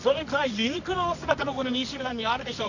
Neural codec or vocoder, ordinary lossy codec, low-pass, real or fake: codec, 24 kHz, 0.9 kbps, WavTokenizer, medium music audio release; none; 7.2 kHz; fake